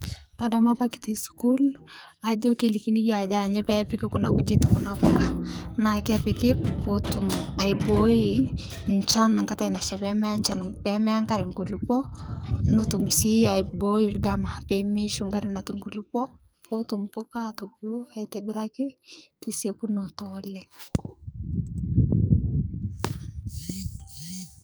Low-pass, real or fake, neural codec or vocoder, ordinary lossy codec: none; fake; codec, 44.1 kHz, 2.6 kbps, SNAC; none